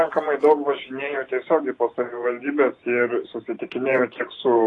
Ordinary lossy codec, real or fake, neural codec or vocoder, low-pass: AAC, 32 kbps; fake; vocoder, 44.1 kHz, 128 mel bands every 512 samples, BigVGAN v2; 10.8 kHz